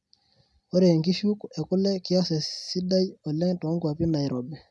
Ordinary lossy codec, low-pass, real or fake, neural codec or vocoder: none; none; real; none